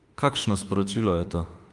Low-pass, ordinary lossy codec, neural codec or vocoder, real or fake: 10.8 kHz; Opus, 32 kbps; autoencoder, 48 kHz, 32 numbers a frame, DAC-VAE, trained on Japanese speech; fake